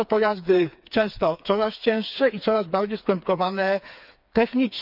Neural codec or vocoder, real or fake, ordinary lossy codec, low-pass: codec, 16 kHz, 4 kbps, FreqCodec, smaller model; fake; none; 5.4 kHz